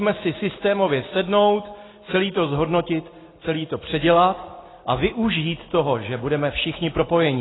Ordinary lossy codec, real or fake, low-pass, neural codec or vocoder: AAC, 16 kbps; real; 7.2 kHz; none